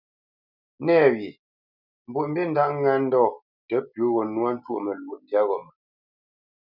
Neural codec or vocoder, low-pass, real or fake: none; 5.4 kHz; real